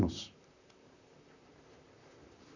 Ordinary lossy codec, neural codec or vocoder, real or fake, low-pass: none; vocoder, 44.1 kHz, 128 mel bands, Pupu-Vocoder; fake; 7.2 kHz